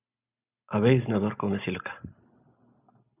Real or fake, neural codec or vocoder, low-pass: real; none; 3.6 kHz